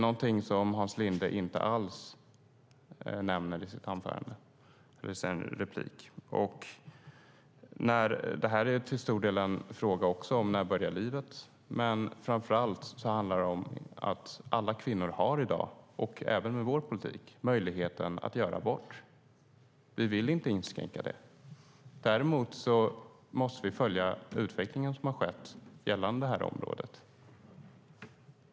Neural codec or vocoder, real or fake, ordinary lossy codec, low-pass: none; real; none; none